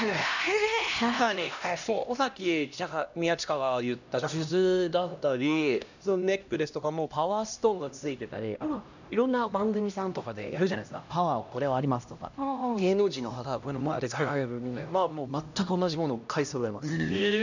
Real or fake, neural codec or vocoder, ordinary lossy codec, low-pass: fake; codec, 16 kHz, 1 kbps, X-Codec, HuBERT features, trained on LibriSpeech; none; 7.2 kHz